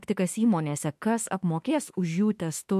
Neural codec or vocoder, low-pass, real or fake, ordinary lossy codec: autoencoder, 48 kHz, 32 numbers a frame, DAC-VAE, trained on Japanese speech; 14.4 kHz; fake; MP3, 64 kbps